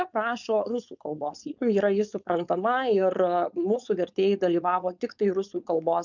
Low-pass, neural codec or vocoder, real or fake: 7.2 kHz; codec, 16 kHz, 4.8 kbps, FACodec; fake